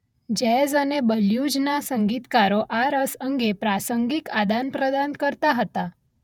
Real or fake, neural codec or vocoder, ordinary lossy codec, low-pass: fake; vocoder, 48 kHz, 128 mel bands, Vocos; none; 19.8 kHz